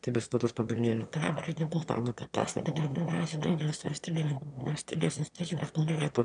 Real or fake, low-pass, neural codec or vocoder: fake; 9.9 kHz; autoencoder, 22.05 kHz, a latent of 192 numbers a frame, VITS, trained on one speaker